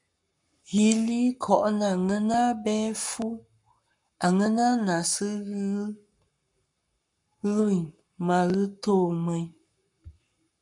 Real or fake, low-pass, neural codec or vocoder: fake; 10.8 kHz; codec, 44.1 kHz, 7.8 kbps, Pupu-Codec